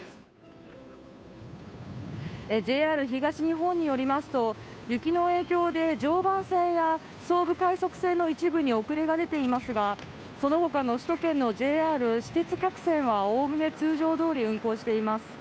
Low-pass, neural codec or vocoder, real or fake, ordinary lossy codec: none; codec, 16 kHz, 2 kbps, FunCodec, trained on Chinese and English, 25 frames a second; fake; none